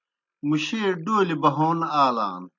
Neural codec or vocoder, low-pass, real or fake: none; 7.2 kHz; real